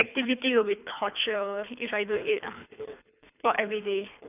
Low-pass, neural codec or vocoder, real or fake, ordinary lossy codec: 3.6 kHz; codec, 24 kHz, 3 kbps, HILCodec; fake; none